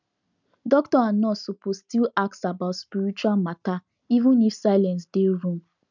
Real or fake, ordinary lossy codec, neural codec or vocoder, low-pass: real; none; none; 7.2 kHz